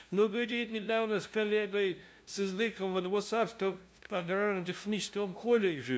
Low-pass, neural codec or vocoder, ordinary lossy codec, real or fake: none; codec, 16 kHz, 0.5 kbps, FunCodec, trained on LibriTTS, 25 frames a second; none; fake